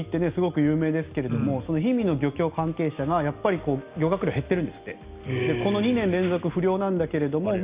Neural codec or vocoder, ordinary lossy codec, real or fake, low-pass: none; Opus, 64 kbps; real; 3.6 kHz